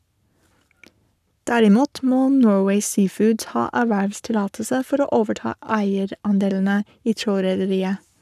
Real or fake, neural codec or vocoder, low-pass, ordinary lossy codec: fake; codec, 44.1 kHz, 7.8 kbps, Pupu-Codec; 14.4 kHz; none